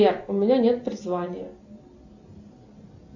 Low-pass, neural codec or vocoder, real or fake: 7.2 kHz; none; real